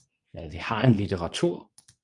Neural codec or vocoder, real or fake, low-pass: codec, 24 kHz, 0.9 kbps, WavTokenizer, medium speech release version 2; fake; 10.8 kHz